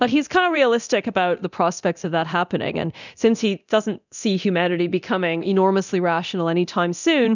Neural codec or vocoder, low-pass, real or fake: codec, 24 kHz, 0.9 kbps, DualCodec; 7.2 kHz; fake